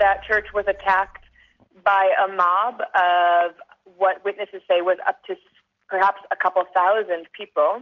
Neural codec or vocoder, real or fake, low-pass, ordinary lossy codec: none; real; 7.2 kHz; MP3, 64 kbps